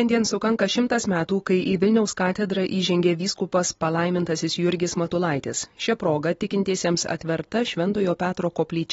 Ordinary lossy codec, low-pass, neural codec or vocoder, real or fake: AAC, 24 kbps; 14.4 kHz; none; real